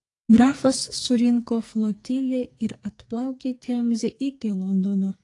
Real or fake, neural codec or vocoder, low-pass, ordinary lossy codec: fake; codec, 44.1 kHz, 2.6 kbps, SNAC; 10.8 kHz; AAC, 48 kbps